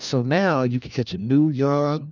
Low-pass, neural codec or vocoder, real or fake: 7.2 kHz; codec, 16 kHz, 1 kbps, FunCodec, trained on LibriTTS, 50 frames a second; fake